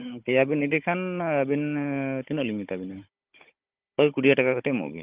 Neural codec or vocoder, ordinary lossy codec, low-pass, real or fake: codec, 16 kHz, 16 kbps, FunCodec, trained on Chinese and English, 50 frames a second; Opus, 32 kbps; 3.6 kHz; fake